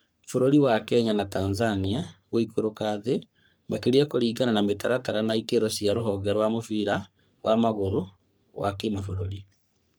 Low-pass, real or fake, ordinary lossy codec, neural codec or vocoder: none; fake; none; codec, 44.1 kHz, 3.4 kbps, Pupu-Codec